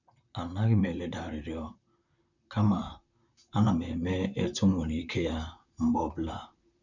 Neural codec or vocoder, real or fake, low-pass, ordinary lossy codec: vocoder, 44.1 kHz, 128 mel bands, Pupu-Vocoder; fake; 7.2 kHz; none